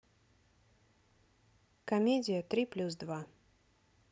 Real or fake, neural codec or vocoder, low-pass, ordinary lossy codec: real; none; none; none